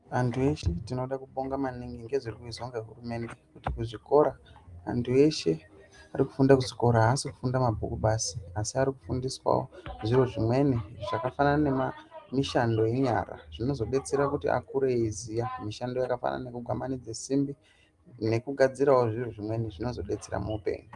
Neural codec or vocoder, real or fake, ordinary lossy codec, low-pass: none; real; Opus, 32 kbps; 10.8 kHz